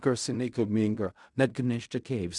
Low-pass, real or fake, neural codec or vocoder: 10.8 kHz; fake; codec, 16 kHz in and 24 kHz out, 0.4 kbps, LongCat-Audio-Codec, fine tuned four codebook decoder